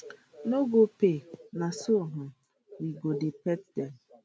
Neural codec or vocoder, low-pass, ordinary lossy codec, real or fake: none; none; none; real